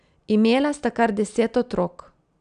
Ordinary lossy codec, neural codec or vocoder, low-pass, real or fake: none; none; 9.9 kHz; real